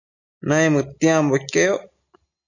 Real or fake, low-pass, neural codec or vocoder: real; 7.2 kHz; none